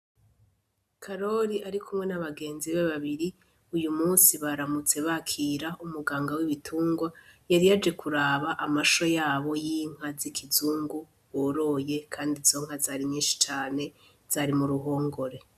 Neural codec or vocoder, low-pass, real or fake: none; 14.4 kHz; real